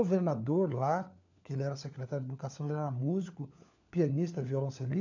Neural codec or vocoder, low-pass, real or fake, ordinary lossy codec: codec, 16 kHz, 4 kbps, FunCodec, trained on LibriTTS, 50 frames a second; 7.2 kHz; fake; none